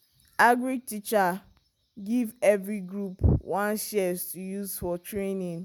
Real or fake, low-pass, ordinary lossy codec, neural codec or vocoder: real; none; none; none